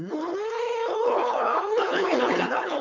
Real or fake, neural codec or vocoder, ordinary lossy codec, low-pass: fake; codec, 16 kHz, 4.8 kbps, FACodec; MP3, 64 kbps; 7.2 kHz